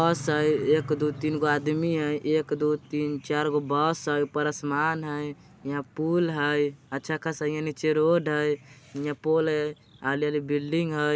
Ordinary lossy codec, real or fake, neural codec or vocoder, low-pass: none; real; none; none